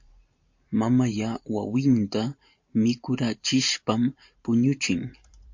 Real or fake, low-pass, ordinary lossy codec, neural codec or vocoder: fake; 7.2 kHz; MP3, 48 kbps; vocoder, 44.1 kHz, 128 mel bands every 512 samples, BigVGAN v2